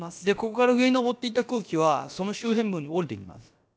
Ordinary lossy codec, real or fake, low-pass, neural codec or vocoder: none; fake; none; codec, 16 kHz, about 1 kbps, DyCAST, with the encoder's durations